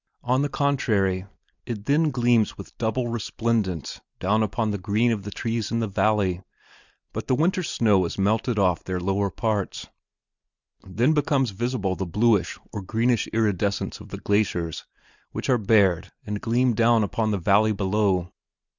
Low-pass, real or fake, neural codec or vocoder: 7.2 kHz; real; none